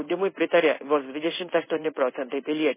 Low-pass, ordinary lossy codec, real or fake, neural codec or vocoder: 3.6 kHz; MP3, 16 kbps; fake; codec, 16 kHz in and 24 kHz out, 1 kbps, XY-Tokenizer